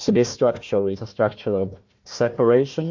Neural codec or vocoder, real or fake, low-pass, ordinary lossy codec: codec, 16 kHz, 1 kbps, FunCodec, trained on Chinese and English, 50 frames a second; fake; 7.2 kHz; MP3, 48 kbps